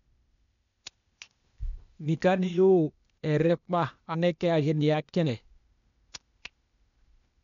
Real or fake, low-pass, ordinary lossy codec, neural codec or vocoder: fake; 7.2 kHz; none; codec, 16 kHz, 0.8 kbps, ZipCodec